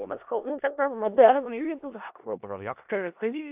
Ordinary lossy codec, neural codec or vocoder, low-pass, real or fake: AAC, 32 kbps; codec, 16 kHz in and 24 kHz out, 0.4 kbps, LongCat-Audio-Codec, four codebook decoder; 3.6 kHz; fake